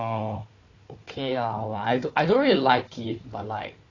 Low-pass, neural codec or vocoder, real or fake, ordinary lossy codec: 7.2 kHz; codec, 16 kHz, 4 kbps, FunCodec, trained on Chinese and English, 50 frames a second; fake; AAC, 32 kbps